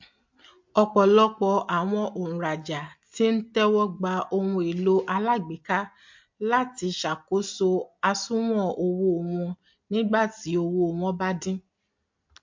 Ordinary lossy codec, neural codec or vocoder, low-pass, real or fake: MP3, 48 kbps; none; 7.2 kHz; real